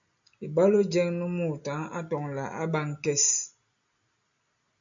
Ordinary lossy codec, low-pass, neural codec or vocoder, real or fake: AAC, 64 kbps; 7.2 kHz; none; real